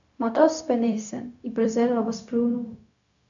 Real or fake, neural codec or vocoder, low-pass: fake; codec, 16 kHz, 0.4 kbps, LongCat-Audio-Codec; 7.2 kHz